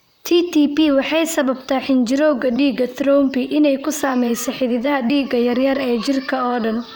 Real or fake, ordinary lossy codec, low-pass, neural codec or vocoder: fake; none; none; vocoder, 44.1 kHz, 128 mel bands, Pupu-Vocoder